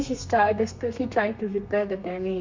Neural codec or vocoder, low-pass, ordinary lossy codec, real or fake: codec, 32 kHz, 1.9 kbps, SNAC; 7.2 kHz; MP3, 64 kbps; fake